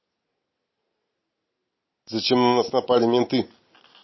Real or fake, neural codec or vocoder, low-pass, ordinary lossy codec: real; none; 7.2 kHz; MP3, 24 kbps